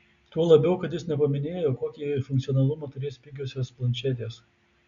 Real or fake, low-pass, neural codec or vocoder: real; 7.2 kHz; none